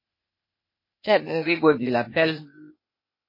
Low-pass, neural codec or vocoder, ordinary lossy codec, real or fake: 5.4 kHz; codec, 16 kHz, 0.8 kbps, ZipCodec; MP3, 24 kbps; fake